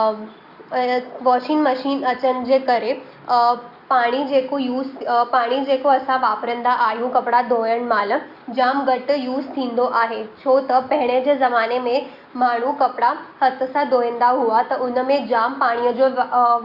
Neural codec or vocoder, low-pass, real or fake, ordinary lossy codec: none; 5.4 kHz; real; Opus, 64 kbps